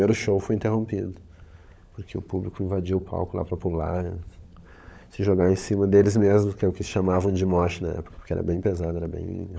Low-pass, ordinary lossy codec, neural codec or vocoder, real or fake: none; none; codec, 16 kHz, 16 kbps, FunCodec, trained on LibriTTS, 50 frames a second; fake